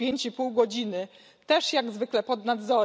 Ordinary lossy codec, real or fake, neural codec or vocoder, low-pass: none; real; none; none